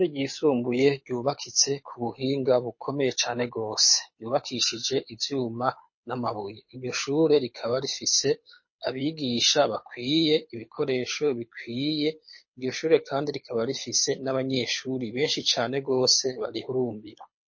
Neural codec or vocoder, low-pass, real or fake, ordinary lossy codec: codec, 24 kHz, 6 kbps, HILCodec; 7.2 kHz; fake; MP3, 32 kbps